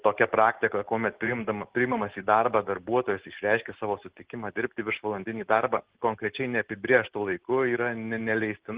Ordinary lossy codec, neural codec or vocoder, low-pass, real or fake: Opus, 16 kbps; none; 3.6 kHz; real